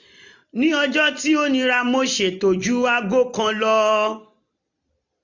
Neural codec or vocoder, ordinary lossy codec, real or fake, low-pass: none; none; real; 7.2 kHz